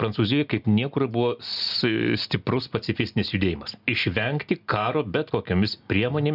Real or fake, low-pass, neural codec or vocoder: real; 5.4 kHz; none